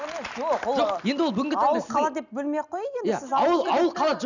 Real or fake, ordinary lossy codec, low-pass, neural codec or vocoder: real; none; 7.2 kHz; none